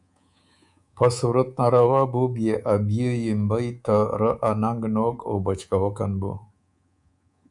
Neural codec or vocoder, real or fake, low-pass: codec, 24 kHz, 3.1 kbps, DualCodec; fake; 10.8 kHz